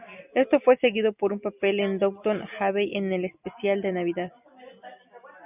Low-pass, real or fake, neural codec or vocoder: 3.6 kHz; real; none